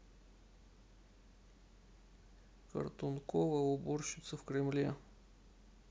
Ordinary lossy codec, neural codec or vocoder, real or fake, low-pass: none; none; real; none